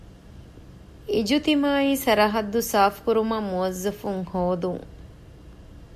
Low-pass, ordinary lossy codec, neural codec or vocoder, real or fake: 14.4 kHz; AAC, 96 kbps; none; real